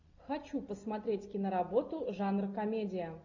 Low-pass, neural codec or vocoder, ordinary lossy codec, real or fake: 7.2 kHz; none; AAC, 48 kbps; real